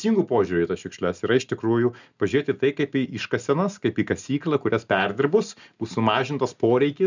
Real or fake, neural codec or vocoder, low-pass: real; none; 7.2 kHz